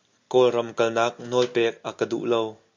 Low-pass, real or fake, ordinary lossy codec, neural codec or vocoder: 7.2 kHz; real; MP3, 48 kbps; none